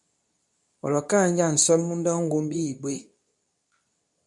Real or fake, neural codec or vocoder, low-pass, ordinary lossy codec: fake; codec, 24 kHz, 0.9 kbps, WavTokenizer, medium speech release version 2; 10.8 kHz; MP3, 96 kbps